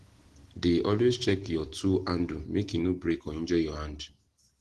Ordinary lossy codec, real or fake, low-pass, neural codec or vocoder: Opus, 16 kbps; real; 10.8 kHz; none